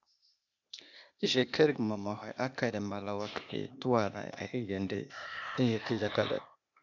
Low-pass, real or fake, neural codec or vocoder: 7.2 kHz; fake; codec, 16 kHz, 0.8 kbps, ZipCodec